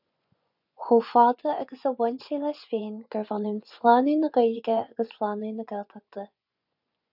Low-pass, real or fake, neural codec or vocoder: 5.4 kHz; fake; vocoder, 24 kHz, 100 mel bands, Vocos